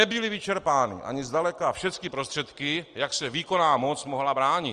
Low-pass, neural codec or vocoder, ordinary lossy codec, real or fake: 9.9 kHz; none; Opus, 24 kbps; real